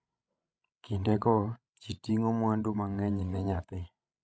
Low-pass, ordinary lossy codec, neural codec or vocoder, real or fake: none; none; codec, 16 kHz, 16 kbps, FreqCodec, larger model; fake